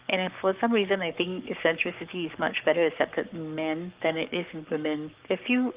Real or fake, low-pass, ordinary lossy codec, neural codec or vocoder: fake; 3.6 kHz; Opus, 32 kbps; codec, 44.1 kHz, 7.8 kbps, Pupu-Codec